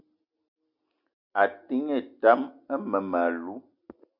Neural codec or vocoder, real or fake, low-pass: none; real; 5.4 kHz